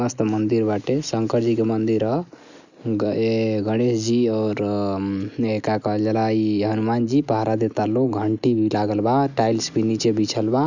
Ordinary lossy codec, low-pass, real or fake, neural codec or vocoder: none; 7.2 kHz; real; none